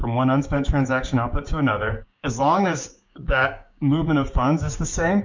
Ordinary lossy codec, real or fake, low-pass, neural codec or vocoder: MP3, 64 kbps; fake; 7.2 kHz; codec, 16 kHz, 6 kbps, DAC